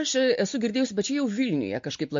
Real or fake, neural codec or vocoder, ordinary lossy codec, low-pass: real; none; MP3, 48 kbps; 7.2 kHz